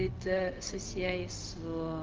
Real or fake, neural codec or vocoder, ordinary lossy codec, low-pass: fake; codec, 16 kHz, 0.4 kbps, LongCat-Audio-Codec; Opus, 32 kbps; 7.2 kHz